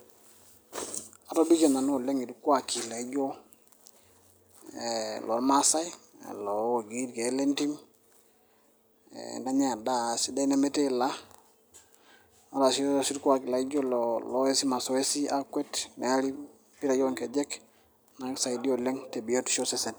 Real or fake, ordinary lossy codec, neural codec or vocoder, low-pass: real; none; none; none